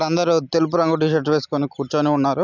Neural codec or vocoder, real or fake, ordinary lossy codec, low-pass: none; real; none; 7.2 kHz